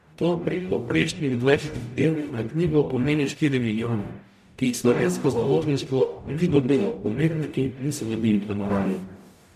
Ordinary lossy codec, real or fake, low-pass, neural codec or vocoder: MP3, 96 kbps; fake; 14.4 kHz; codec, 44.1 kHz, 0.9 kbps, DAC